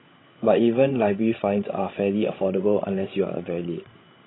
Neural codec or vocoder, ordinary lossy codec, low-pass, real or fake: codec, 16 kHz, 16 kbps, FreqCodec, larger model; AAC, 16 kbps; 7.2 kHz; fake